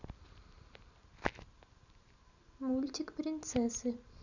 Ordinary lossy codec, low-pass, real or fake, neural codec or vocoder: none; 7.2 kHz; real; none